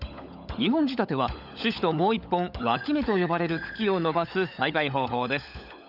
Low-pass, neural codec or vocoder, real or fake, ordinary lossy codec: 5.4 kHz; codec, 16 kHz, 16 kbps, FunCodec, trained on LibriTTS, 50 frames a second; fake; none